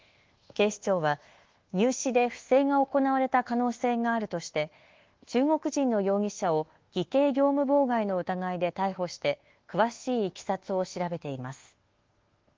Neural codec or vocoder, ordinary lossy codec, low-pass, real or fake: codec, 24 kHz, 1.2 kbps, DualCodec; Opus, 16 kbps; 7.2 kHz; fake